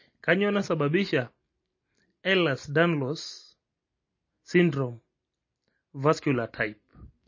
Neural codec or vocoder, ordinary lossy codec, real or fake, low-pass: none; MP3, 32 kbps; real; 7.2 kHz